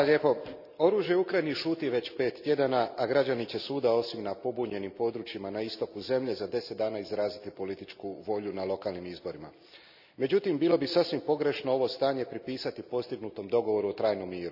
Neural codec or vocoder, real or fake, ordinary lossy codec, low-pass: none; real; none; 5.4 kHz